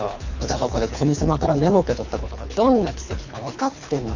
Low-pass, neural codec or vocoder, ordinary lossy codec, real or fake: 7.2 kHz; codec, 24 kHz, 3 kbps, HILCodec; none; fake